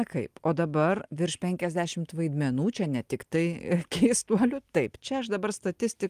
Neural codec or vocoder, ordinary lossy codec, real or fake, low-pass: none; Opus, 32 kbps; real; 14.4 kHz